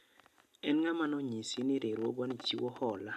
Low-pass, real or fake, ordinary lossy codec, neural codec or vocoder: 14.4 kHz; real; MP3, 96 kbps; none